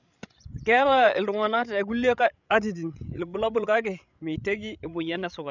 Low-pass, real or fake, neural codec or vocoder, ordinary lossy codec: 7.2 kHz; fake; codec, 16 kHz, 16 kbps, FreqCodec, larger model; none